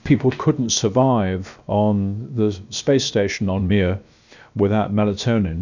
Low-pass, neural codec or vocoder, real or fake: 7.2 kHz; codec, 16 kHz, about 1 kbps, DyCAST, with the encoder's durations; fake